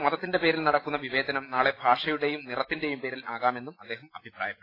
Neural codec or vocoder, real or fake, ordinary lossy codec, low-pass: none; real; AAC, 24 kbps; 5.4 kHz